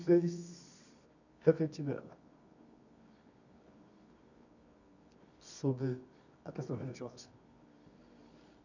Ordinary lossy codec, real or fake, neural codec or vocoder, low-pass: none; fake; codec, 24 kHz, 0.9 kbps, WavTokenizer, medium music audio release; 7.2 kHz